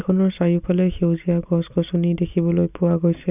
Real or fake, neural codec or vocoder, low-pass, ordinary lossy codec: real; none; 3.6 kHz; none